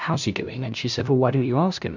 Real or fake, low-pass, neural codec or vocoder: fake; 7.2 kHz; codec, 16 kHz, 0.5 kbps, FunCodec, trained on LibriTTS, 25 frames a second